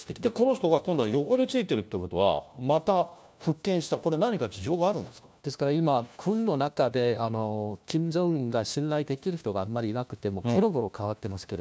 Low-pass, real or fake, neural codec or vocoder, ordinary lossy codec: none; fake; codec, 16 kHz, 1 kbps, FunCodec, trained on LibriTTS, 50 frames a second; none